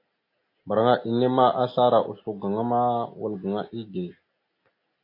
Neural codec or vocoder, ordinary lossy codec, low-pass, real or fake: none; AAC, 32 kbps; 5.4 kHz; real